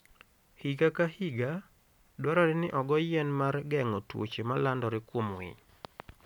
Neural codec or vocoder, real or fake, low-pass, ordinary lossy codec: none; real; 19.8 kHz; none